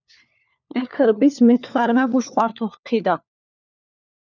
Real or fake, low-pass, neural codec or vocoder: fake; 7.2 kHz; codec, 16 kHz, 4 kbps, FunCodec, trained on LibriTTS, 50 frames a second